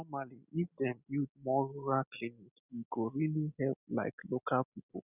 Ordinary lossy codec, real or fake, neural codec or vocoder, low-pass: none; real; none; 3.6 kHz